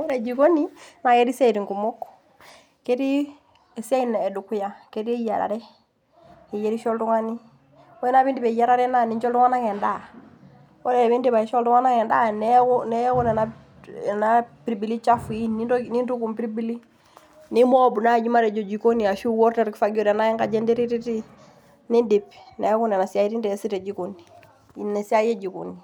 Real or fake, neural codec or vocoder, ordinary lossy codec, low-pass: real; none; none; 19.8 kHz